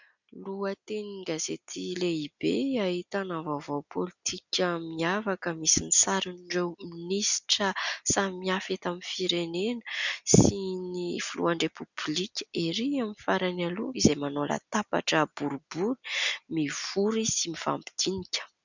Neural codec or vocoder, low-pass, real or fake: none; 7.2 kHz; real